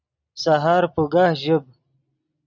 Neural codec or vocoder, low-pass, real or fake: none; 7.2 kHz; real